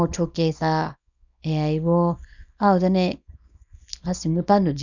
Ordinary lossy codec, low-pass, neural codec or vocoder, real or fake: none; 7.2 kHz; codec, 24 kHz, 0.9 kbps, WavTokenizer, small release; fake